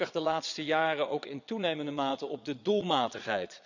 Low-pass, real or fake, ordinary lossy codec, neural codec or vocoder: 7.2 kHz; fake; none; vocoder, 22.05 kHz, 80 mel bands, Vocos